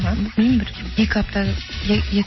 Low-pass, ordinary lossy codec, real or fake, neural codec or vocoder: 7.2 kHz; MP3, 24 kbps; real; none